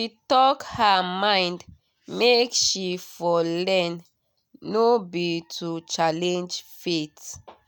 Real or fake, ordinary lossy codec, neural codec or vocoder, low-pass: real; none; none; none